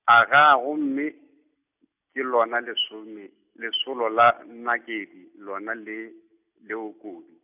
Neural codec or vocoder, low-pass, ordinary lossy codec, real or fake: none; 3.6 kHz; none; real